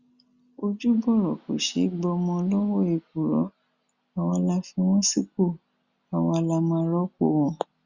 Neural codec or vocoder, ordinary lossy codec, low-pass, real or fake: none; Opus, 64 kbps; 7.2 kHz; real